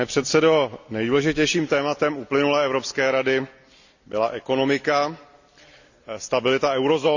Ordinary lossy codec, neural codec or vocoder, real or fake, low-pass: none; none; real; 7.2 kHz